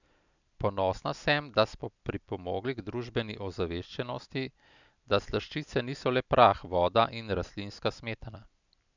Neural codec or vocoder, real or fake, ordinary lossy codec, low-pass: none; real; none; 7.2 kHz